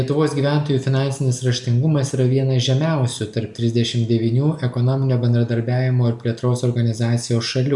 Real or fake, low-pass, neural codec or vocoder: real; 10.8 kHz; none